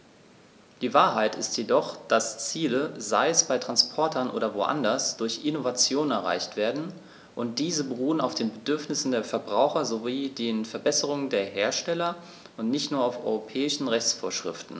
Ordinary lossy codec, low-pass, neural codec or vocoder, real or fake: none; none; none; real